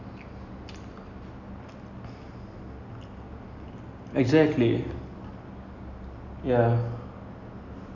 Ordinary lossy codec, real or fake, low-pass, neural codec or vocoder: none; real; 7.2 kHz; none